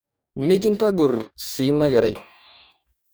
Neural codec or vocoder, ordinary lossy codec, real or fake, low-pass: codec, 44.1 kHz, 2.6 kbps, DAC; none; fake; none